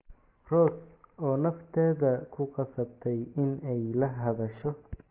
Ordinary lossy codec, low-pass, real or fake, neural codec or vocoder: Opus, 16 kbps; 3.6 kHz; real; none